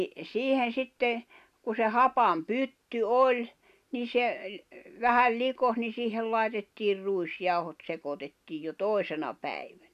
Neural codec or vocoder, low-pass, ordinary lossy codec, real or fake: none; 14.4 kHz; none; real